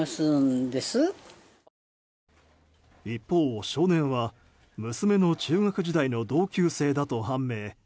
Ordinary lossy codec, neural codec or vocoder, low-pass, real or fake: none; none; none; real